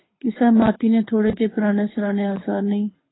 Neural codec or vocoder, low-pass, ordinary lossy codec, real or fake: codec, 24 kHz, 6 kbps, HILCodec; 7.2 kHz; AAC, 16 kbps; fake